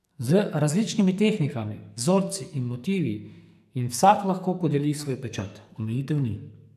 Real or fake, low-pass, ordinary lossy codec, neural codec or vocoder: fake; 14.4 kHz; none; codec, 44.1 kHz, 2.6 kbps, SNAC